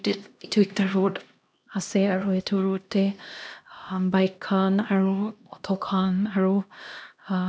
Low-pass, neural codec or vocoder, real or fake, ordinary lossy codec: none; codec, 16 kHz, 1 kbps, X-Codec, HuBERT features, trained on LibriSpeech; fake; none